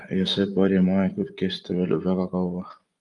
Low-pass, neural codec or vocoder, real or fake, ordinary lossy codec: 10.8 kHz; vocoder, 24 kHz, 100 mel bands, Vocos; fake; Opus, 32 kbps